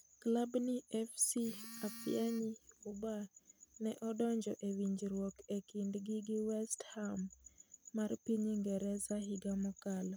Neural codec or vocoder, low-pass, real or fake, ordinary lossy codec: none; none; real; none